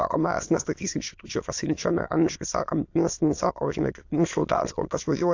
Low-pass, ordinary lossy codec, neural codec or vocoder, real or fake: 7.2 kHz; AAC, 48 kbps; autoencoder, 22.05 kHz, a latent of 192 numbers a frame, VITS, trained on many speakers; fake